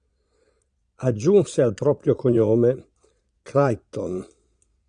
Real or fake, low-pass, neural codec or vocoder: fake; 9.9 kHz; vocoder, 22.05 kHz, 80 mel bands, Vocos